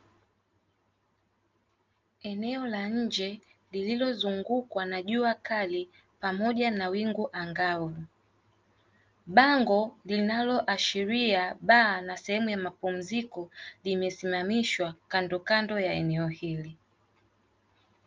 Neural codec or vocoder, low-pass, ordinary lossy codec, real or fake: none; 7.2 kHz; Opus, 24 kbps; real